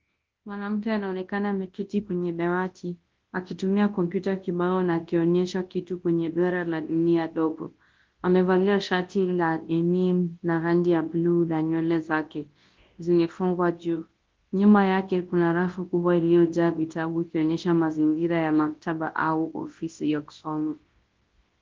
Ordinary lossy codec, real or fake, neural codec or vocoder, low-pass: Opus, 16 kbps; fake; codec, 24 kHz, 0.9 kbps, WavTokenizer, large speech release; 7.2 kHz